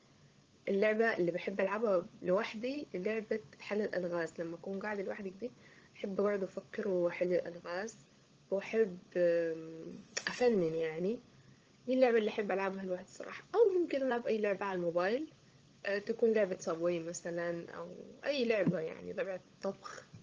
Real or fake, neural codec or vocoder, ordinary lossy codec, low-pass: fake; codec, 16 kHz, 16 kbps, FunCodec, trained on LibriTTS, 50 frames a second; Opus, 24 kbps; 7.2 kHz